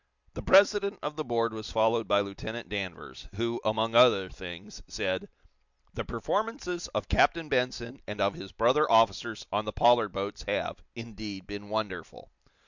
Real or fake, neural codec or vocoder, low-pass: real; none; 7.2 kHz